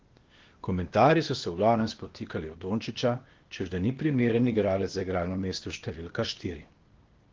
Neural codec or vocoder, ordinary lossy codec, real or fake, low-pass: codec, 16 kHz, 0.8 kbps, ZipCodec; Opus, 16 kbps; fake; 7.2 kHz